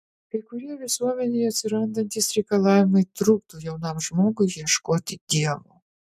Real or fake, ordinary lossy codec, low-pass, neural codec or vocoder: real; MP3, 96 kbps; 14.4 kHz; none